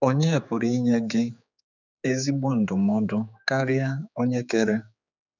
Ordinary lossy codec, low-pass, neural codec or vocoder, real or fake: none; 7.2 kHz; codec, 16 kHz, 4 kbps, X-Codec, HuBERT features, trained on general audio; fake